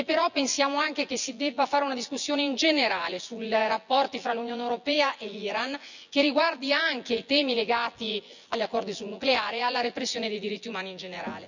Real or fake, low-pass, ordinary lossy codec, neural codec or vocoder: fake; 7.2 kHz; none; vocoder, 24 kHz, 100 mel bands, Vocos